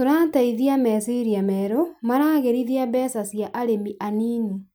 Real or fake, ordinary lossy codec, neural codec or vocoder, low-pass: real; none; none; none